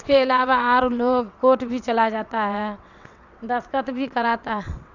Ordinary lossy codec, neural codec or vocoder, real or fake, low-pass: none; vocoder, 22.05 kHz, 80 mel bands, WaveNeXt; fake; 7.2 kHz